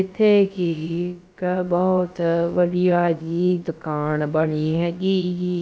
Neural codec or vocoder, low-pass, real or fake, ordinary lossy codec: codec, 16 kHz, about 1 kbps, DyCAST, with the encoder's durations; none; fake; none